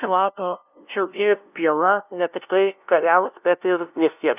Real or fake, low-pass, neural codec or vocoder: fake; 3.6 kHz; codec, 16 kHz, 0.5 kbps, FunCodec, trained on LibriTTS, 25 frames a second